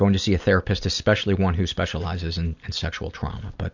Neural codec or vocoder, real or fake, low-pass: none; real; 7.2 kHz